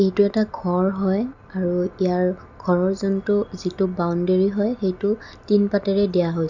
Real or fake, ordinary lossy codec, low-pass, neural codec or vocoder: real; none; 7.2 kHz; none